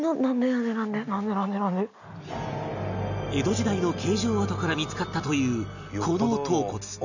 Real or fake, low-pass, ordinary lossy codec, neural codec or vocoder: real; 7.2 kHz; none; none